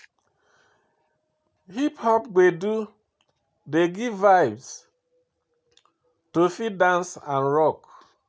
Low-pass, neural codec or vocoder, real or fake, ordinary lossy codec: none; none; real; none